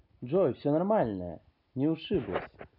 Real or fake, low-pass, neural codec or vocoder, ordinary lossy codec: real; 5.4 kHz; none; none